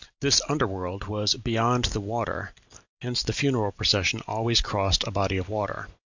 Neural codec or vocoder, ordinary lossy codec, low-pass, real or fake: none; Opus, 64 kbps; 7.2 kHz; real